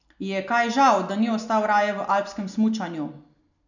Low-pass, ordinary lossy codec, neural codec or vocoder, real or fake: 7.2 kHz; none; none; real